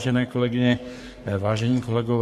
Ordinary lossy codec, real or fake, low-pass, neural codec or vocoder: MP3, 64 kbps; fake; 14.4 kHz; codec, 44.1 kHz, 3.4 kbps, Pupu-Codec